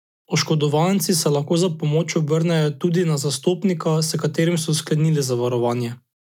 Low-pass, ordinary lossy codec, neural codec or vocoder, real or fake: 19.8 kHz; none; none; real